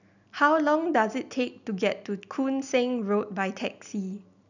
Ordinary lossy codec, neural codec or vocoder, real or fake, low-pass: none; none; real; 7.2 kHz